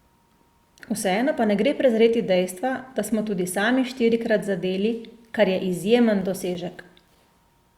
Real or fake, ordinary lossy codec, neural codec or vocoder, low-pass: real; Opus, 64 kbps; none; 19.8 kHz